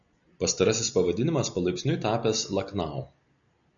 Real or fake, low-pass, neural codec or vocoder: real; 7.2 kHz; none